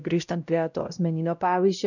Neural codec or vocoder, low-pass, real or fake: codec, 16 kHz, 0.5 kbps, X-Codec, WavLM features, trained on Multilingual LibriSpeech; 7.2 kHz; fake